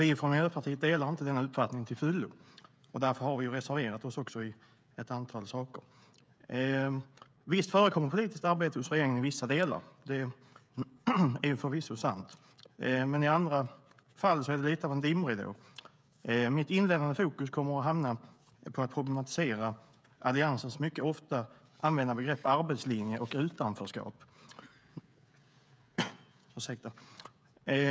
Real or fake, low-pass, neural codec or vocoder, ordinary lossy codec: fake; none; codec, 16 kHz, 16 kbps, FreqCodec, smaller model; none